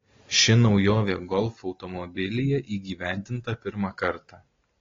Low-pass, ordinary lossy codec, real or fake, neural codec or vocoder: 7.2 kHz; AAC, 32 kbps; real; none